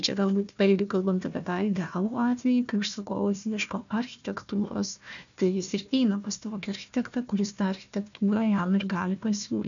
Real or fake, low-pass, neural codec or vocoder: fake; 7.2 kHz; codec, 16 kHz, 1 kbps, FunCodec, trained on Chinese and English, 50 frames a second